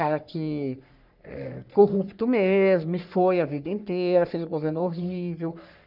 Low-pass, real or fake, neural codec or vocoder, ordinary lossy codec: 5.4 kHz; fake; codec, 44.1 kHz, 3.4 kbps, Pupu-Codec; none